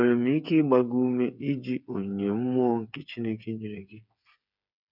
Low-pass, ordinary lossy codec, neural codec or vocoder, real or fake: 5.4 kHz; none; codec, 16 kHz, 8 kbps, FreqCodec, smaller model; fake